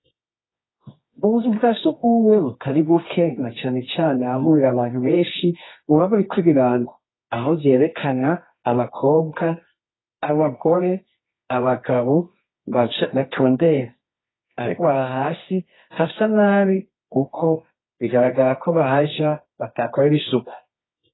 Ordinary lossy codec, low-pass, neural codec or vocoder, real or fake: AAC, 16 kbps; 7.2 kHz; codec, 24 kHz, 0.9 kbps, WavTokenizer, medium music audio release; fake